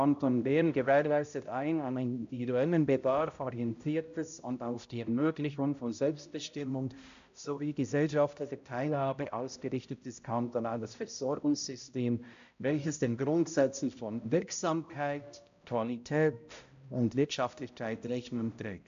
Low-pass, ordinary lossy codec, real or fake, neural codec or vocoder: 7.2 kHz; AAC, 64 kbps; fake; codec, 16 kHz, 0.5 kbps, X-Codec, HuBERT features, trained on balanced general audio